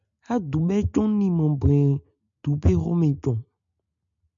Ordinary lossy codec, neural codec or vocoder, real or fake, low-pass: MP3, 96 kbps; none; real; 7.2 kHz